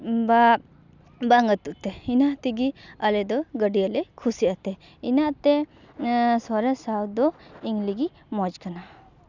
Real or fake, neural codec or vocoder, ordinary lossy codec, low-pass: real; none; none; 7.2 kHz